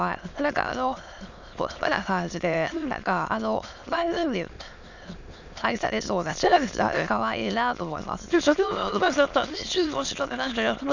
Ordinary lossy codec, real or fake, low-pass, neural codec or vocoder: none; fake; 7.2 kHz; autoencoder, 22.05 kHz, a latent of 192 numbers a frame, VITS, trained on many speakers